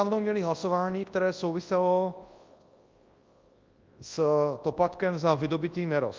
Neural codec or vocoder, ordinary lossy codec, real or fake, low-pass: codec, 24 kHz, 0.9 kbps, WavTokenizer, large speech release; Opus, 32 kbps; fake; 7.2 kHz